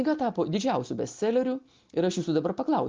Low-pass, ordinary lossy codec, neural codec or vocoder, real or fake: 7.2 kHz; Opus, 32 kbps; none; real